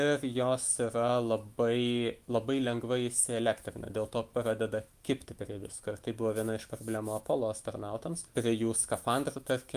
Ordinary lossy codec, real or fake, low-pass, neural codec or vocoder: Opus, 32 kbps; fake; 14.4 kHz; autoencoder, 48 kHz, 128 numbers a frame, DAC-VAE, trained on Japanese speech